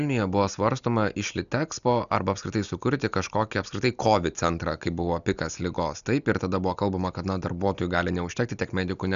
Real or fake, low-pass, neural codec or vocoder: real; 7.2 kHz; none